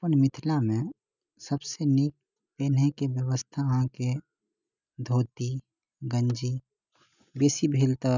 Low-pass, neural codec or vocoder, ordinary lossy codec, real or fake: 7.2 kHz; none; none; real